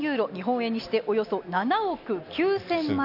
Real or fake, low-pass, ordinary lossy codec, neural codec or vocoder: real; 5.4 kHz; none; none